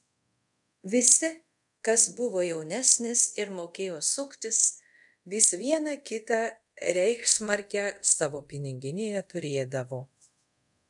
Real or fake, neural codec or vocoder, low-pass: fake; codec, 24 kHz, 0.5 kbps, DualCodec; 10.8 kHz